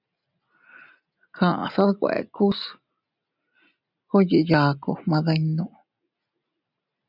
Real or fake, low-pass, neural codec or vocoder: real; 5.4 kHz; none